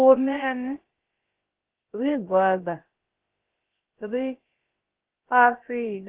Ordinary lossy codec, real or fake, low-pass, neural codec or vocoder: Opus, 16 kbps; fake; 3.6 kHz; codec, 16 kHz, about 1 kbps, DyCAST, with the encoder's durations